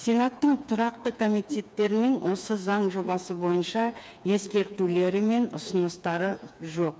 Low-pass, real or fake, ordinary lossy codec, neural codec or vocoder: none; fake; none; codec, 16 kHz, 4 kbps, FreqCodec, smaller model